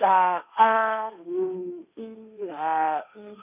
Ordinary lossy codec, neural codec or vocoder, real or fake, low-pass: none; codec, 16 kHz, 1.1 kbps, Voila-Tokenizer; fake; 3.6 kHz